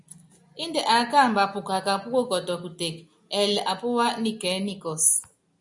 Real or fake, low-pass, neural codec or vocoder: real; 10.8 kHz; none